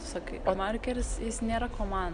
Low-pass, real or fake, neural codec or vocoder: 9.9 kHz; real; none